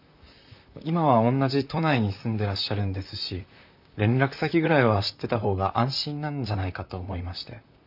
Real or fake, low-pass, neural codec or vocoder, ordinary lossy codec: fake; 5.4 kHz; vocoder, 44.1 kHz, 128 mel bands, Pupu-Vocoder; none